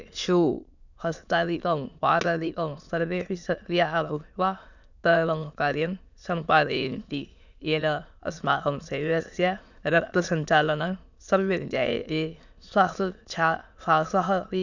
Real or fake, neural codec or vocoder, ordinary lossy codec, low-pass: fake; autoencoder, 22.05 kHz, a latent of 192 numbers a frame, VITS, trained on many speakers; none; 7.2 kHz